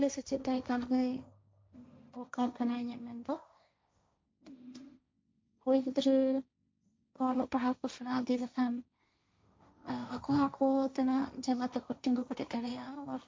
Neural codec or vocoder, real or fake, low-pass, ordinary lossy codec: codec, 16 kHz, 1.1 kbps, Voila-Tokenizer; fake; none; none